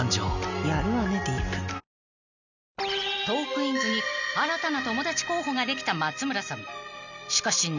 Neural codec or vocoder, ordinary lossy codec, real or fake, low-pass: none; none; real; 7.2 kHz